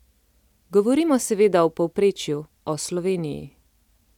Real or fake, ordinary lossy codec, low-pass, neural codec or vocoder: real; none; 19.8 kHz; none